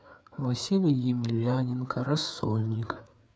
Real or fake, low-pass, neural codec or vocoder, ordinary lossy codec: fake; none; codec, 16 kHz, 4 kbps, FreqCodec, larger model; none